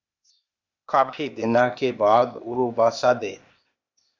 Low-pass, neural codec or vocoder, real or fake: 7.2 kHz; codec, 16 kHz, 0.8 kbps, ZipCodec; fake